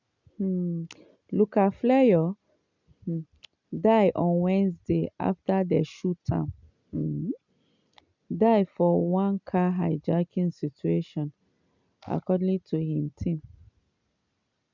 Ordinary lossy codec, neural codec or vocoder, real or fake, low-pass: none; none; real; 7.2 kHz